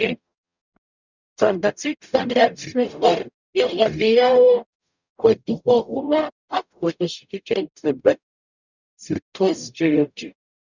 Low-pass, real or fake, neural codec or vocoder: 7.2 kHz; fake; codec, 44.1 kHz, 0.9 kbps, DAC